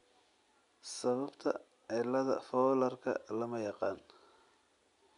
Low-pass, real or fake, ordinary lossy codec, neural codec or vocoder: 10.8 kHz; real; none; none